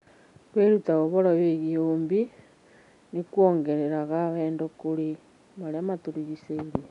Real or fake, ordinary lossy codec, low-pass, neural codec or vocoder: real; none; 10.8 kHz; none